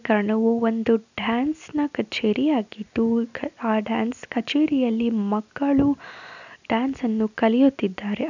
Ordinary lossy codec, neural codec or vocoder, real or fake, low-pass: none; none; real; 7.2 kHz